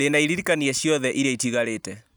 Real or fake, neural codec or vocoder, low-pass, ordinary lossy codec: real; none; none; none